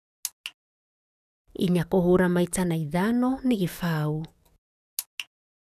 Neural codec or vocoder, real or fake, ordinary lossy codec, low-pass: codec, 44.1 kHz, 7.8 kbps, DAC; fake; none; 14.4 kHz